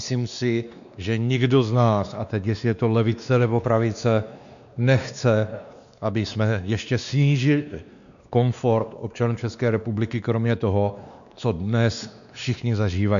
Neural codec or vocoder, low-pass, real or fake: codec, 16 kHz, 2 kbps, X-Codec, WavLM features, trained on Multilingual LibriSpeech; 7.2 kHz; fake